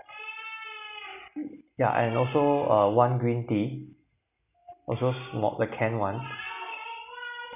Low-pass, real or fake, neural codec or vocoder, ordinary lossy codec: 3.6 kHz; real; none; none